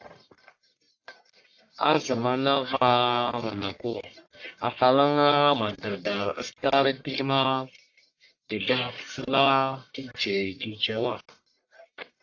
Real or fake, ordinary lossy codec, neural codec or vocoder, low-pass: fake; AAC, 48 kbps; codec, 44.1 kHz, 1.7 kbps, Pupu-Codec; 7.2 kHz